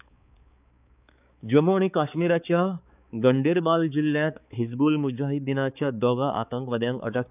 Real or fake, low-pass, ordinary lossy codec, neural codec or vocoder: fake; 3.6 kHz; none; codec, 16 kHz, 4 kbps, X-Codec, HuBERT features, trained on balanced general audio